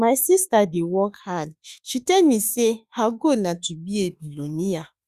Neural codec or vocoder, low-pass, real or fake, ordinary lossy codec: autoencoder, 48 kHz, 32 numbers a frame, DAC-VAE, trained on Japanese speech; 14.4 kHz; fake; Opus, 64 kbps